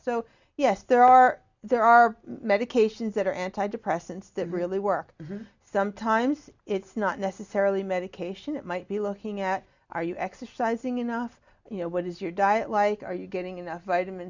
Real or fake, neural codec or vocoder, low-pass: real; none; 7.2 kHz